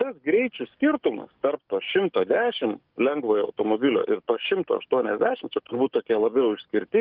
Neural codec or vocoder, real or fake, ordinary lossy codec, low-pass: codec, 44.1 kHz, 7.8 kbps, DAC; fake; Opus, 24 kbps; 5.4 kHz